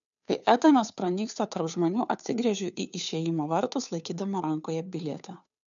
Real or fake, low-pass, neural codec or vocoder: fake; 7.2 kHz; codec, 16 kHz, 2 kbps, FunCodec, trained on Chinese and English, 25 frames a second